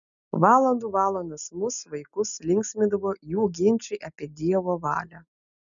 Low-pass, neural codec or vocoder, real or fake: 7.2 kHz; none; real